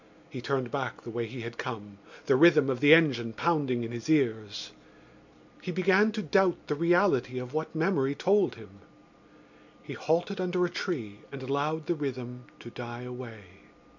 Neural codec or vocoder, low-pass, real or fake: none; 7.2 kHz; real